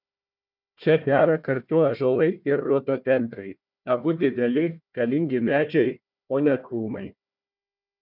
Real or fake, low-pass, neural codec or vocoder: fake; 5.4 kHz; codec, 16 kHz, 1 kbps, FunCodec, trained on Chinese and English, 50 frames a second